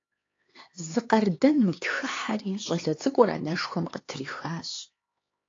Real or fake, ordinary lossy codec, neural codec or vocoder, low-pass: fake; AAC, 32 kbps; codec, 16 kHz, 4 kbps, X-Codec, HuBERT features, trained on LibriSpeech; 7.2 kHz